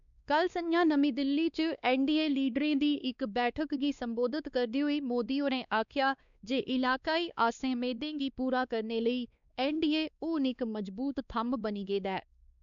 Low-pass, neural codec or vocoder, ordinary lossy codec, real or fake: 7.2 kHz; codec, 16 kHz, 2 kbps, X-Codec, WavLM features, trained on Multilingual LibriSpeech; none; fake